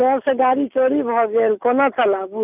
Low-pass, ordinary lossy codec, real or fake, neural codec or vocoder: 3.6 kHz; none; real; none